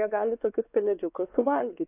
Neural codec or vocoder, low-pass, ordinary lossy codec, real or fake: codec, 16 kHz, 2 kbps, X-Codec, WavLM features, trained on Multilingual LibriSpeech; 3.6 kHz; AAC, 24 kbps; fake